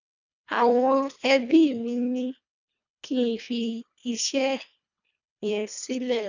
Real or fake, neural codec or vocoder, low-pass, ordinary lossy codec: fake; codec, 24 kHz, 1.5 kbps, HILCodec; 7.2 kHz; none